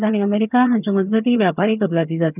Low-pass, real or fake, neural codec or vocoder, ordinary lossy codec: 3.6 kHz; fake; vocoder, 22.05 kHz, 80 mel bands, HiFi-GAN; none